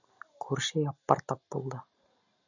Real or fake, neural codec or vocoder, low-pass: real; none; 7.2 kHz